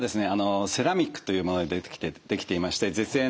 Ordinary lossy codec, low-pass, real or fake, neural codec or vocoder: none; none; real; none